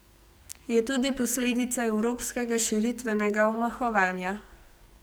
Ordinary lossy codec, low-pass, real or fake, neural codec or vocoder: none; none; fake; codec, 44.1 kHz, 2.6 kbps, SNAC